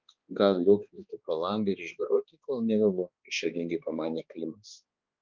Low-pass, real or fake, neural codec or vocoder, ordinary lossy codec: 7.2 kHz; fake; codec, 16 kHz, 2 kbps, X-Codec, HuBERT features, trained on balanced general audio; Opus, 24 kbps